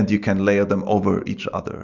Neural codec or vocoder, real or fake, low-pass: none; real; 7.2 kHz